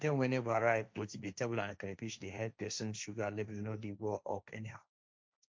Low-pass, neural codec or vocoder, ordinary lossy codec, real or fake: none; codec, 16 kHz, 1.1 kbps, Voila-Tokenizer; none; fake